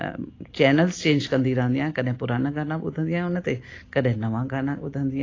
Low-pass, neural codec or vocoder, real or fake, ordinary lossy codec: 7.2 kHz; none; real; AAC, 32 kbps